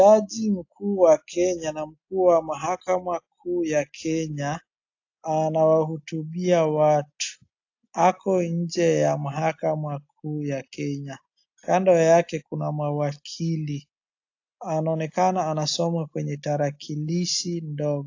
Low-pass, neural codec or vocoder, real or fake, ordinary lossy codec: 7.2 kHz; none; real; AAC, 48 kbps